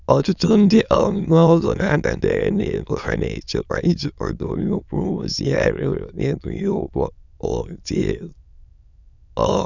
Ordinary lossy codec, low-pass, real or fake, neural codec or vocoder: none; 7.2 kHz; fake; autoencoder, 22.05 kHz, a latent of 192 numbers a frame, VITS, trained on many speakers